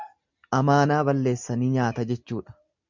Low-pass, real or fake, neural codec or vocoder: 7.2 kHz; real; none